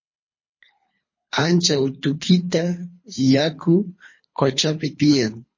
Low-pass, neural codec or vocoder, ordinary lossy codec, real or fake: 7.2 kHz; codec, 24 kHz, 3 kbps, HILCodec; MP3, 32 kbps; fake